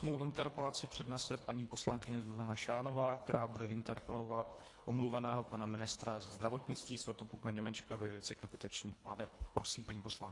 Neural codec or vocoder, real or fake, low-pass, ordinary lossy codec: codec, 24 kHz, 1.5 kbps, HILCodec; fake; 10.8 kHz; AAC, 48 kbps